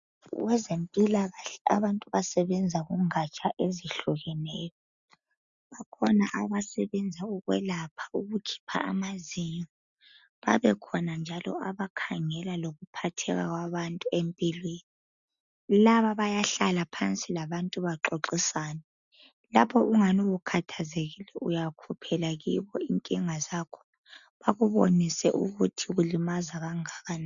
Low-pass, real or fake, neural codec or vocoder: 7.2 kHz; real; none